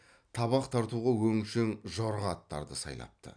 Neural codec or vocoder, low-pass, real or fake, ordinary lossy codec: none; 9.9 kHz; real; none